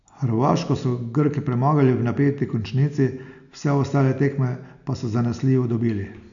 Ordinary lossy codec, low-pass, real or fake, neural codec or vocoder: none; 7.2 kHz; real; none